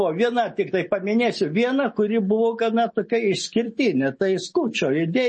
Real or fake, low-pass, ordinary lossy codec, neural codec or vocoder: real; 10.8 kHz; MP3, 32 kbps; none